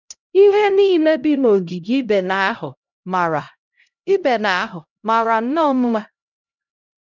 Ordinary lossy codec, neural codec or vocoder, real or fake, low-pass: none; codec, 16 kHz, 0.5 kbps, X-Codec, HuBERT features, trained on LibriSpeech; fake; 7.2 kHz